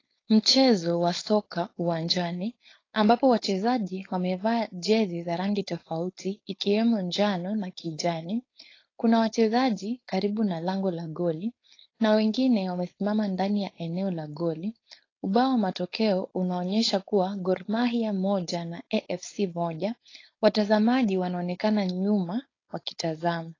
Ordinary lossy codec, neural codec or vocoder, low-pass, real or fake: AAC, 32 kbps; codec, 16 kHz, 4.8 kbps, FACodec; 7.2 kHz; fake